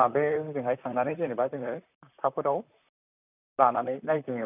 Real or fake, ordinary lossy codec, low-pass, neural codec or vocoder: fake; none; 3.6 kHz; vocoder, 44.1 kHz, 128 mel bands, Pupu-Vocoder